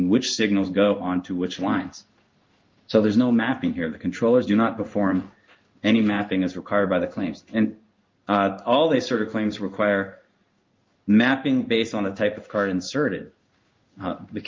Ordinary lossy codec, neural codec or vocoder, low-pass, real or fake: Opus, 32 kbps; codec, 16 kHz in and 24 kHz out, 1 kbps, XY-Tokenizer; 7.2 kHz; fake